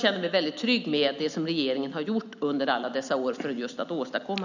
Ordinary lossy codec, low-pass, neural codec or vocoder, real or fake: none; 7.2 kHz; none; real